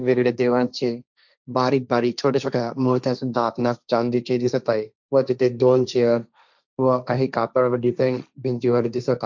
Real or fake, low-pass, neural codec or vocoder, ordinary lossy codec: fake; 7.2 kHz; codec, 16 kHz, 1.1 kbps, Voila-Tokenizer; none